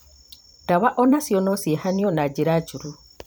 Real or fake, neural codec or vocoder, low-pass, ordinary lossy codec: fake; vocoder, 44.1 kHz, 128 mel bands every 256 samples, BigVGAN v2; none; none